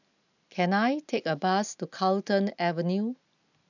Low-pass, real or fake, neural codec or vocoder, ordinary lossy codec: 7.2 kHz; real; none; none